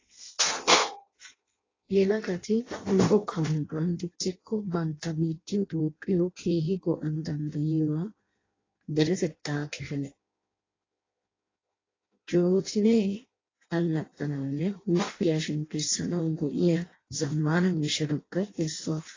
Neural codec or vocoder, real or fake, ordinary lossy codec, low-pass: codec, 16 kHz in and 24 kHz out, 0.6 kbps, FireRedTTS-2 codec; fake; AAC, 32 kbps; 7.2 kHz